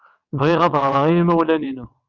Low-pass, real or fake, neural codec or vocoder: 7.2 kHz; fake; vocoder, 22.05 kHz, 80 mel bands, WaveNeXt